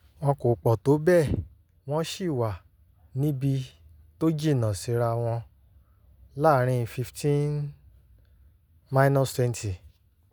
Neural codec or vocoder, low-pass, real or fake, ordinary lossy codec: none; none; real; none